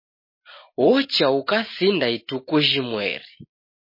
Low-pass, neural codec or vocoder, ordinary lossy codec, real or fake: 5.4 kHz; none; MP3, 24 kbps; real